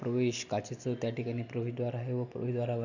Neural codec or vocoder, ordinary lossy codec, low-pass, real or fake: none; none; 7.2 kHz; real